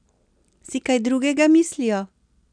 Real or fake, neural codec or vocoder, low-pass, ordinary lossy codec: real; none; 9.9 kHz; none